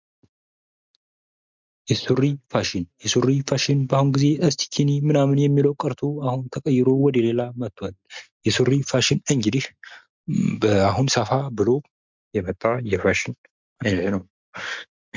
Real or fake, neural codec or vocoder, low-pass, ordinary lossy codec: real; none; 7.2 kHz; MP3, 64 kbps